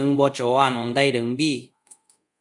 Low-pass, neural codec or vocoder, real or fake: 10.8 kHz; codec, 24 kHz, 0.5 kbps, DualCodec; fake